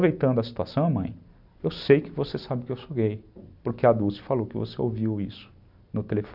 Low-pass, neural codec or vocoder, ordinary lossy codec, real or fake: 5.4 kHz; none; none; real